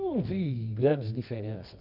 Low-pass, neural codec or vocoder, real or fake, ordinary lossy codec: 5.4 kHz; codec, 24 kHz, 0.9 kbps, WavTokenizer, medium music audio release; fake; none